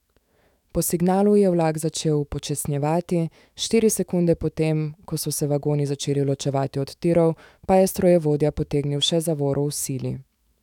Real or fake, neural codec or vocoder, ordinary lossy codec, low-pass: fake; autoencoder, 48 kHz, 128 numbers a frame, DAC-VAE, trained on Japanese speech; none; 19.8 kHz